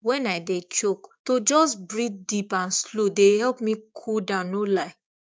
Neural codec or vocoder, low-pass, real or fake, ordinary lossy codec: codec, 16 kHz, 6 kbps, DAC; none; fake; none